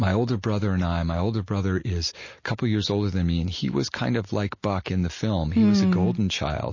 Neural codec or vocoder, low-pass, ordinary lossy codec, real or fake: none; 7.2 kHz; MP3, 32 kbps; real